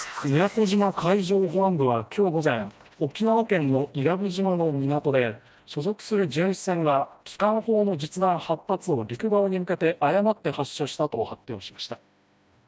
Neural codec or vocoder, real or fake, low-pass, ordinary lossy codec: codec, 16 kHz, 1 kbps, FreqCodec, smaller model; fake; none; none